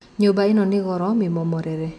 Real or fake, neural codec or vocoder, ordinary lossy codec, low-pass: real; none; none; none